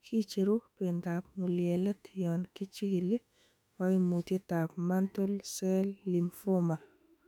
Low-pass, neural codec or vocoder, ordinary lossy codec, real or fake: 19.8 kHz; autoencoder, 48 kHz, 32 numbers a frame, DAC-VAE, trained on Japanese speech; none; fake